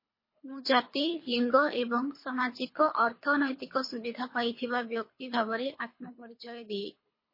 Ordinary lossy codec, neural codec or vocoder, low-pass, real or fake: MP3, 24 kbps; codec, 24 kHz, 3 kbps, HILCodec; 5.4 kHz; fake